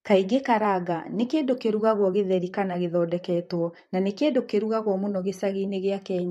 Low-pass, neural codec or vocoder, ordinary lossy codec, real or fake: 14.4 kHz; vocoder, 44.1 kHz, 128 mel bands, Pupu-Vocoder; MP3, 64 kbps; fake